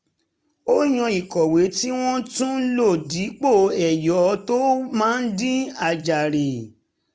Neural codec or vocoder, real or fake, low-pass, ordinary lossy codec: none; real; none; none